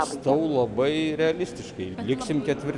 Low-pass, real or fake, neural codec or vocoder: 10.8 kHz; real; none